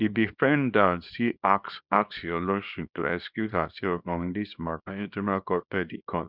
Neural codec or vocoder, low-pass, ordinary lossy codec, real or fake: codec, 24 kHz, 0.9 kbps, WavTokenizer, small release; 5.4 kHz; none; fake